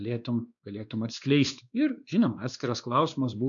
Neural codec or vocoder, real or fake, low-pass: codec, 16 kHz, 2 kbps, X-Codec, WavLM features, trained on Multilingual LibriSpeech; fake; 7.2 kHz